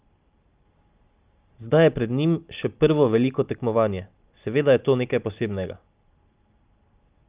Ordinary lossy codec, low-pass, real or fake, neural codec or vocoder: Opus, 24 kbps; 3.6 kHz; real; none